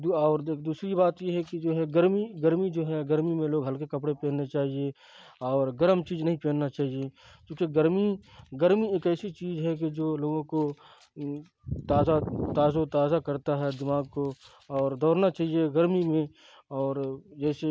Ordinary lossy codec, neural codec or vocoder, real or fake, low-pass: none; none; real; none